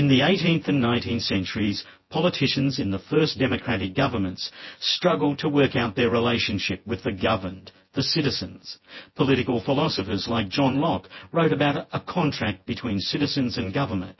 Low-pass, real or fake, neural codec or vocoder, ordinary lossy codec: 7.2 kHz; fake; vocoder, 24 kHz, 100 mel bands, Vocos; MP3, 24 kbps